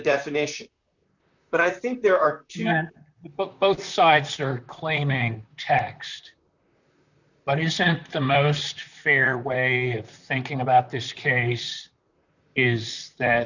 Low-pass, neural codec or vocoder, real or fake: 7.2 kHz; vocoder, 44.1 kHz, 128 mel bands, Pupu-Vocoder; fake